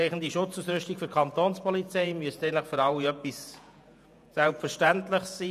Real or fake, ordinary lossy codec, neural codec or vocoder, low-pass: fake; none; vocoder, 48 kHz, 128 mel bands, Vocos; 14.4 kHz